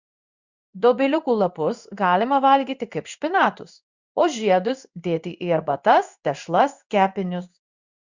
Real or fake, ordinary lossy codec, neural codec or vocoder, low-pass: fake; Opus, 64 kbps; codec, 16 kHz in and 24 kHz out, 1 kbps, XY-Tokenizer; 7.2 kHz